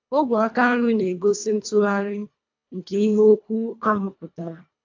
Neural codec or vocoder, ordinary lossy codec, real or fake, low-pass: codec, 24 kHz, 1.5 kbps, HILCodec; AAC, 48 kbps; fake; 7.2 kHz